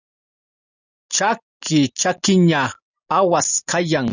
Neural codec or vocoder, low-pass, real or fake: none; 7.2 kHz; real